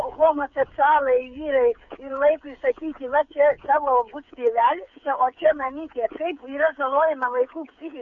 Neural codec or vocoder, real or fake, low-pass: codec, 16 kHz, 8 kbps, FreqCodec, smaller model; fake; 7.2 kHz